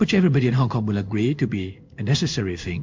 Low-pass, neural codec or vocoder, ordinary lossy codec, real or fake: 7.2 kHz; codec, 16 kHz in and 24 kHz out, 1 kbps, XY-Tokenizer; MP3, 64 kbps; fake